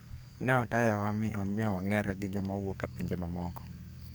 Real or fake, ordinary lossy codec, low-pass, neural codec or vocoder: fake; none; none; codec, 44.1 kHz, 2.6 kbps, SNAC